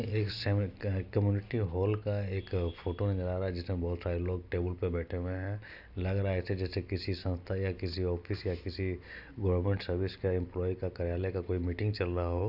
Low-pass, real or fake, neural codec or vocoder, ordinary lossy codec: 5.4 kHz; real; none; none